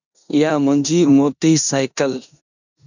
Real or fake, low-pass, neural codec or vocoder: fake; 7.2 kHz; codec, 16 kHz in and 24 kHz out, 0.9 kbps, LongCat-Audio-Codec, four codebook decoder